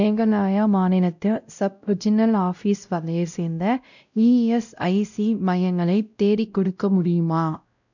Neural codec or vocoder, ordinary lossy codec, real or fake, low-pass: codec, 16 kHz, 0.5 kbps, X-Codec, WavLM features, trained on Multilingual LibriSpeech; none; fake; 7.2 kHz